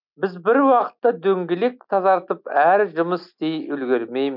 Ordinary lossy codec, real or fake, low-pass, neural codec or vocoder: none; real; 5.4 kHz; none